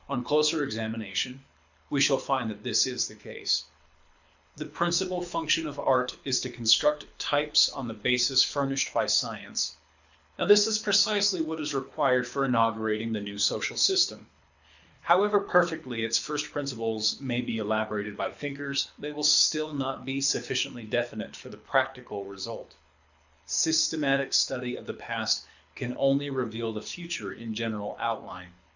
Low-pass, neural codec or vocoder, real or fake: 7.2 kHz; codec, 24 kHz, 6 kbps, HILCodec; fake